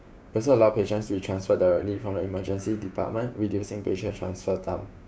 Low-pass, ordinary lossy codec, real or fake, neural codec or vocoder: none; none; fake; codec, 16 kHz, 6 kbps, DAC